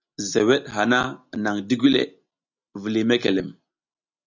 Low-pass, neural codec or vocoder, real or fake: 7.2 kHz; none; real